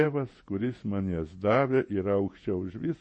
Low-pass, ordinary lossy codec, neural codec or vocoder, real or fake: 9.9 kHz; MP3, 32 kbps; vocoder, 48 kHz, 128 mel bands, Vocos; fake